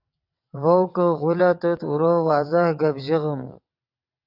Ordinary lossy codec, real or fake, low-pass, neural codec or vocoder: AAC, 48 kbps; fake; 5.4 kHz; vocoder, 22.05 kHz, 80 mel bands, WaveNeXt